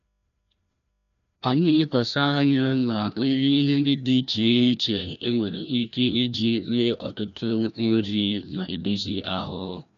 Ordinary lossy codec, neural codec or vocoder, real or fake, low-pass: none; codec, 16 kHz, 1 kbps, FreqCodec, larger model; fake; 7.2 kHz